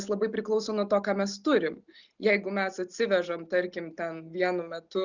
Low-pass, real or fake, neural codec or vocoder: 7.2 kHz; real; none